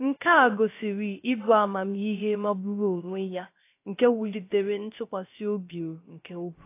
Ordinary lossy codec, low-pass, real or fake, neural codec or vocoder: AAC, 24 kbps; 3.6 kHz; fake; codec, 16 kHz, 0.3 kbps, FocalCodec